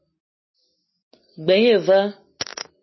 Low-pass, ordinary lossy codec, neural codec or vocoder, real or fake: 7.2 kHz; MP3, 24 kbps; none; real